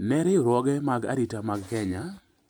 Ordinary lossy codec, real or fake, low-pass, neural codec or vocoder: none; real; none; none